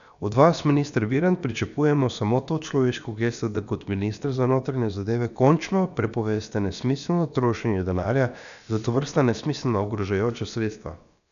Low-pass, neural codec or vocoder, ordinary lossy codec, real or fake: 7.2 kHz; codec, 16 kHz, about 1 kbps, DyCAST, with the encoder's durations; none; fake